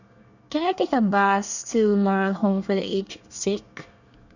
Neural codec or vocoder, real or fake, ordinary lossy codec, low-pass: codec, 24 kHz, 1 kbps, SNAC; fake; none; 7.2 kHz